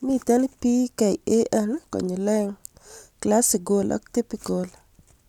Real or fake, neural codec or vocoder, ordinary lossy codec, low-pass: real; none; none; 19.8 kHz